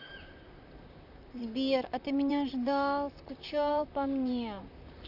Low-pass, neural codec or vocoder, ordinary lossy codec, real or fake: 5.4 kHz; vocoder, 44.1 kHz, 128 mel bands, Pupu-Vocoder; none; fake